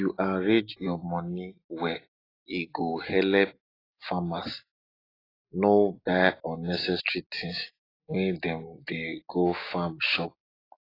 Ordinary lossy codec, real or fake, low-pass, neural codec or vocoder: AAC, 24 kbps; real; 5.4 kHz; none